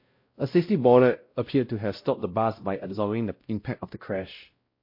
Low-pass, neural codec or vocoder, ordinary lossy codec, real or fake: 5.4 kHz; codec, 16 kHz, 0.5 kbps, X-Codec, WavLM features, trained on Multilingual LibriSpeech; MP3, 32 kbps; fake